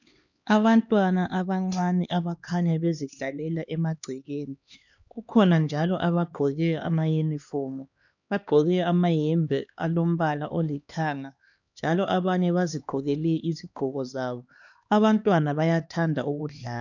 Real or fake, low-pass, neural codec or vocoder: fake; 7.2 kHz; codec, 16 kHz, 2 kbps, X-Codec, HuBERT features, trained on LibriSpeech